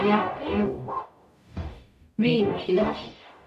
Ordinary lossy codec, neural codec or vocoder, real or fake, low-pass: none; codec, 44.1 kHz, 0.9 kbps, DAC; fake; 14.4 kHz